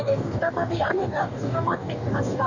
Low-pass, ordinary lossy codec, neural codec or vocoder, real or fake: 7.2 kHz; none; codec, 44.1 kHz, 2.6 kbps, DAC; fake